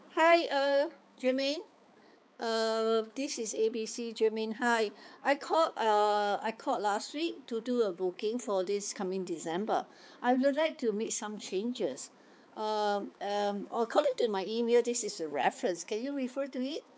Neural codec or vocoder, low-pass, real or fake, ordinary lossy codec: codec, 16 kHz, 4 kbps, X-Codec, HuBERT features, trained on balanced general audio; none; fake; none